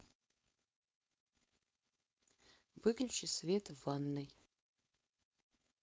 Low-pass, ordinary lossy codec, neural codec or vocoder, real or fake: none; none; codec, 16 kHz, 4.8 kbps, FACodec; fake